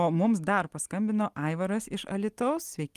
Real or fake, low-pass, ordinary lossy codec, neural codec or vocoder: real; 14.4 kHz; Opus, 24 kbps; none